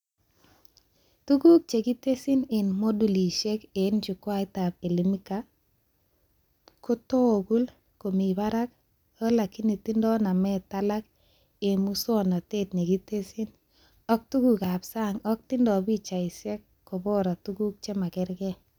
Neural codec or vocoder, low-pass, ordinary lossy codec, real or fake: none; 19.8 kHz; none; real